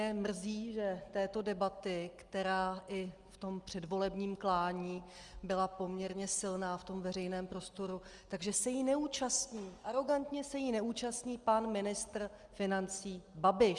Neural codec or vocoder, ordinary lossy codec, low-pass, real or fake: none; Opus, 32 kbps; 10.8 kHz; real